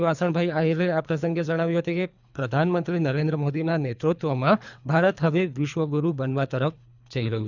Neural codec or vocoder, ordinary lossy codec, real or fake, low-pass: codec, 24 kHz, 3 kbps, HILCodec; none; fake; 7.2 kHz